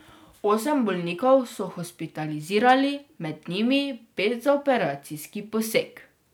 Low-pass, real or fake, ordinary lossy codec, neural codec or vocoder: none; real; none; none